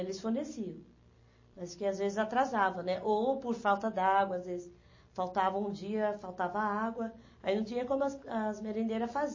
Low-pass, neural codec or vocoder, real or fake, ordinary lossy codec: 7.2 kHz; none; real; MP3, 32 kbps